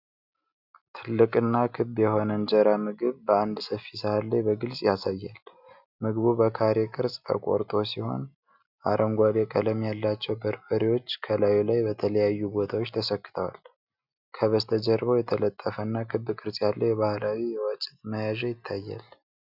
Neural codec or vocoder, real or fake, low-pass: none; real; 5.4 kHz